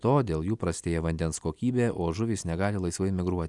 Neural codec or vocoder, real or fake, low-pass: none; real; 10.8 kHz